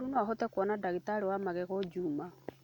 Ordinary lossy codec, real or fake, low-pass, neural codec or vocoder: none; real; 19.8 kHz; none